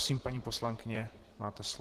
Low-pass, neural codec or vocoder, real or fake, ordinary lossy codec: 14.4 kHz; vocoder, 44.1 kHz, 128 mel bands, Pupu-Vocoder; fake; Opus, 16 kbps